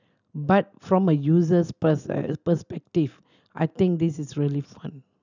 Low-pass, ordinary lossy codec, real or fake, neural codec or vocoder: 7.2 kHz; none; real; none